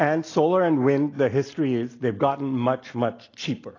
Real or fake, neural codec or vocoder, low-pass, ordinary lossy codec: real; none; 7.2 kHz; AAC, 32 kbps